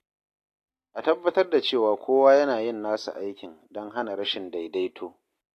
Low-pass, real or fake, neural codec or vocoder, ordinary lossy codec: 14.4 kHz; real; none; AAC, 64 kbps